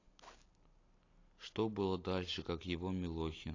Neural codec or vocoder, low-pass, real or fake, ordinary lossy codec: none; 7.2 kHz; real; AAC, 32 kbps